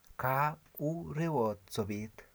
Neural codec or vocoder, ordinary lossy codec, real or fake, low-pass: none; none; real; none